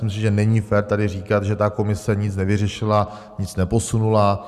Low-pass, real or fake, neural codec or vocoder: 14.4 kHz; real; none